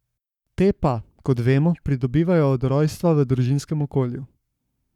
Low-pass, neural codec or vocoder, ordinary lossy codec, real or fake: 19.8 kHz; codec, 44.1 kHz, 7.8 kbps, Pupu-Codec; none; fake